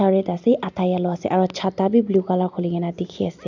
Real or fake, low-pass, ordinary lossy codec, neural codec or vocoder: real; 7.2 kHz; none; none